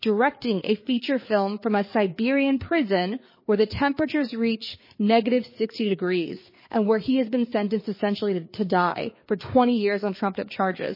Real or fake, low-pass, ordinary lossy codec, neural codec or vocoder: fake; 5.4 kHz; MP3, 24 kbps; codec, 16 kHz, 4 kbps, FreqCodec, larger model